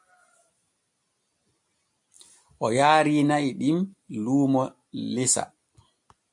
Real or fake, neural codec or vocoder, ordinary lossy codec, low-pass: real; none; AAC, 64 kbps; 10.8 kHz